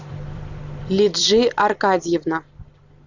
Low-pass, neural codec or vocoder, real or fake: 7.2 kHz; vocoder, 44.1 kHz, 128 mel bands every 256 samples, BigVGAN v2; fake